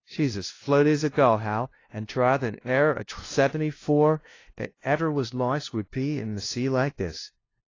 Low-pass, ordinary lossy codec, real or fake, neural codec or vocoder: 7.2 kHz; AAC, 32 kbps; fake; codec, 16 kHz, 0.5 kbps, FunCodec, trained on LibriTTS, 25 frames a second